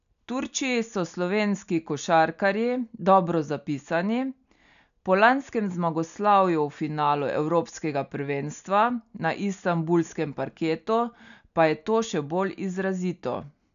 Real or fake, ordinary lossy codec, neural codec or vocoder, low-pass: real; none; none; 7.2 kHz